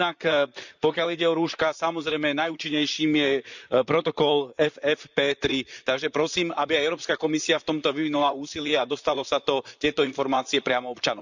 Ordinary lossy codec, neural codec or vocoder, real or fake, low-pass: none; vocoder, 44.1 kHz, 128 mel bands, Pupu-Vocoder; fake; 7.2 kHz